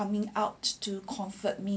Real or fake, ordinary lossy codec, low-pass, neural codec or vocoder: real; none; none; none